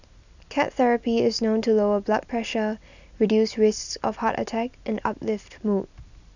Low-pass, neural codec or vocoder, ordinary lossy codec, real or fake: 7.2 kHz; none; none; real